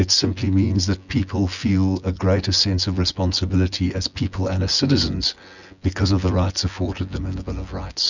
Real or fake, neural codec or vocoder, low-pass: fake; vocoder, 24 kHz, 100 mel bands, Vocos; 7.2 kHz